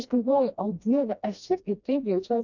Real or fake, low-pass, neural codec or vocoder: fake; 7.2 kHz; codec, 16 kHz, 1 kbps, FreqCodec, smaller model